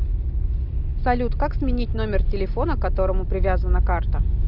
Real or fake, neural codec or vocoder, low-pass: real; none; 5.4 kHz